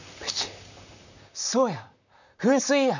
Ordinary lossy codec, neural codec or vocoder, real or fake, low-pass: none; vocoder, 44.1 kHz, 128 mel bands, Pupu-Vocoder; fake; 7.2 kHz